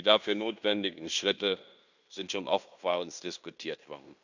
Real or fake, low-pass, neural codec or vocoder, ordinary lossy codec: fake; 7.2 kHz; codec, 16 kHz in and 24 kHz out, 0.9 kbps, LongCat-Audio-Codec, fine tuned four codebook decoder; none